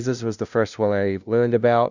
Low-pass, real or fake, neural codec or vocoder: 7.2 kHz; fake; codec, 16 kHz, 0.5 kbps, FunCodec, trained on LibriTTS, 25 frames a second